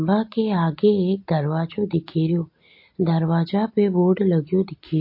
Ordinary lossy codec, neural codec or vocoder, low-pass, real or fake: MP3, 32 kbps; none; 5.4 kHz; real